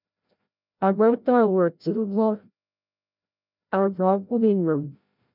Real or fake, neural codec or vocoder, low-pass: fake; codec, 16 kHz, 0.5 kbps, FreqCodec, larger model; 5.4 kHz